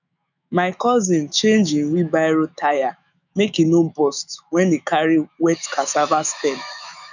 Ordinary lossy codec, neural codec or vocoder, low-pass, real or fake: none; autoencoder, 48 kHz, 128 numbers a frame, DAC-VAE, trained on Japanese speech; 7.2 kHz; fake